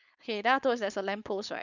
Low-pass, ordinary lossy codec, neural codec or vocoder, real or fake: 7.2 kHz; none; codec, 16 kHz, 4.8 kbps, FACodec; fake